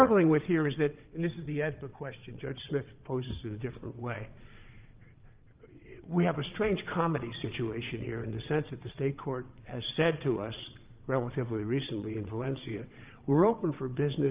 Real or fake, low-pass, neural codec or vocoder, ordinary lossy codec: fake; 3.6 kHz; vocoder, 22.05 kHz, 80 mel bands, WaveNeXt; Opus, 24 kbps